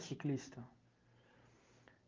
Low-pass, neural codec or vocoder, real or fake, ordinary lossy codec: 7.2 kHz; none; real; Opus, 24 kbps